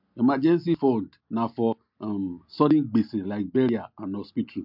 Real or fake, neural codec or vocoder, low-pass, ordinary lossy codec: real; none; 5.4 kHz; MP3, 48 kbps